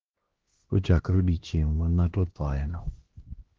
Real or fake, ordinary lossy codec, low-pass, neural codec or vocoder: fake; Opus, 16 kbps; 7.2 kHz; codec, 16 kHz, 1 kbps, X-Codec, HuBERT features, trained on LibriSpeech